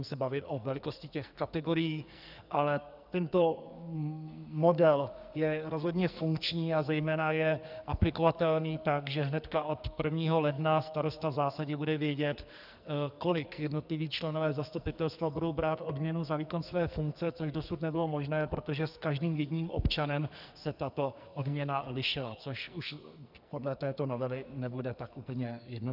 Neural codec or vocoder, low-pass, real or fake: codec, 44.1 kHz, 2.6 kbps, SNAC; 5.4 kHz; fake